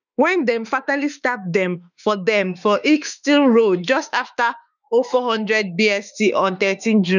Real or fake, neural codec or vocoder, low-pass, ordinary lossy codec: fake; autoencoder, 48 kHz, 32 numbers a frame, DAC-VAE, trained on Japanese speech; 7.2 kHz; none